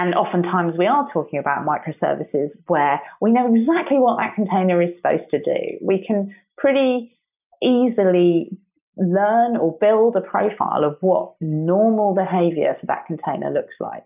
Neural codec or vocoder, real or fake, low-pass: none; real; 3.6 kHz